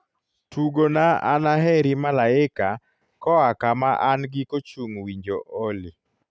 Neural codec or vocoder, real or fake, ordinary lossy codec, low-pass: none; real; none; none